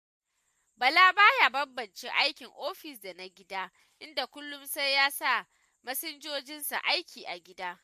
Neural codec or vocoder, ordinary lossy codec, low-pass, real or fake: none; MP3, 64 kbps; 14.4 kHz; real